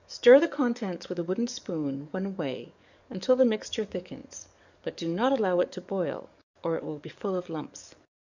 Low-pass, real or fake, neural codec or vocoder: 7.2 kHz; fake; codec, 44.1 kHz, 7.8 kbps, DAC